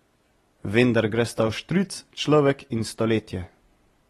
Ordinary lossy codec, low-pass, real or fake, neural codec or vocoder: AAC, 32 kbps; 14.4 kHz; real; none